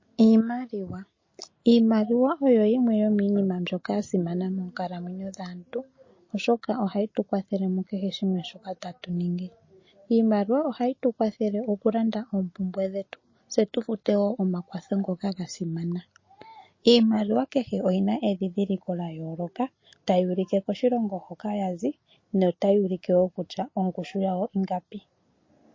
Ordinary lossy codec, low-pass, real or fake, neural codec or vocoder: MP3, 32 kbps; 7.2 kHz; real; none